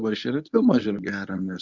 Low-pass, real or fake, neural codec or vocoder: 7.2 kHz; fake; codec, 24 kHz, 0.9 kbps, WavTokenizer, medium speech release version 1